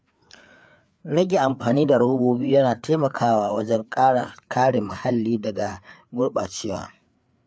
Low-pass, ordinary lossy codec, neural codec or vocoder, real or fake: none; none; codec, 16 kHz, 4 kbps, FreqCodec, larger model; fake